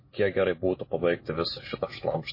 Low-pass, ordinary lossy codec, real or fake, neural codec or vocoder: 5.4 kHz; MP3, 24 kbps; real; none